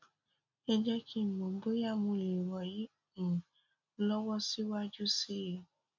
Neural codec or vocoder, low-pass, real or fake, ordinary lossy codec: none; 7.2 kHz; real; none